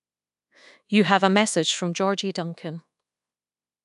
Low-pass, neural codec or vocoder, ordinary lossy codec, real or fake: 10.8 kHz; codec, 24 kHz, 1.2 kbps, DualCodec; none; fake